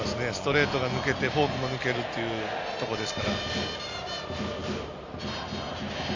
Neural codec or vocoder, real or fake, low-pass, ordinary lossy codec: none; real; 7.2 kHz; MP3, 64 kbps